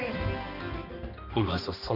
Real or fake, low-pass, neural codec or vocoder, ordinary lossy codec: fake; 5.4 kHz; codec, 16 kHz, 2 kbps, X-Codec, HuBERT features, trained on balanced general audio; AAC, 48 kbps